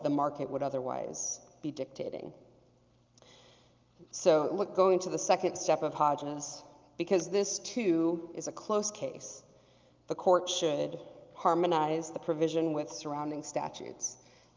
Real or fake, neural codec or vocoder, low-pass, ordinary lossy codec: real; none; 7.2 kHz; Opus, 24 kbps